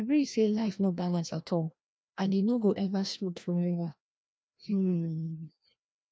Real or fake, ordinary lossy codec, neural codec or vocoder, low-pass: fake; none; codec, 16 kHz, 1 kbps, FreqCodec, larger model; none